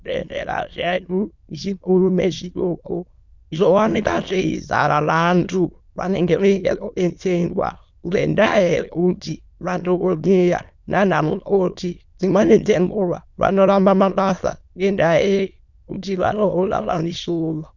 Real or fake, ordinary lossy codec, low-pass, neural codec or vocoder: fake; Opus, 64 kbps; 7.2 kHz; autoencoder, 22.05 kHz, a latent of 192 numbers a frame, VITS, trained on many speakers